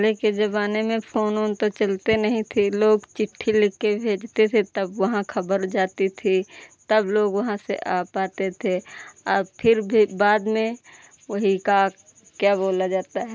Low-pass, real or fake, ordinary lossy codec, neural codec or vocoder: none; real; none; none